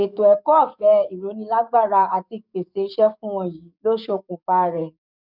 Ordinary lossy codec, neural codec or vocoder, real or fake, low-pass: none; codec, 44.1 kHz, 7.8 kbps, DAC; fake; 5.4 kHz